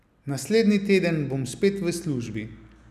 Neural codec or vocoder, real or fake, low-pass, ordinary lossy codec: none; real; 14.4 kHz; none